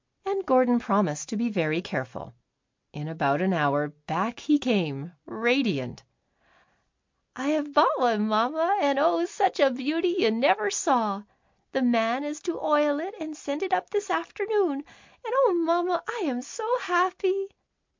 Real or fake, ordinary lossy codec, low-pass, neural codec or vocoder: real; MP3, 48 kbps; 7.2 kHz; none